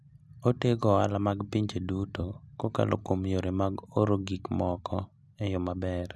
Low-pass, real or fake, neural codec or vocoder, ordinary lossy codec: none; real; none; none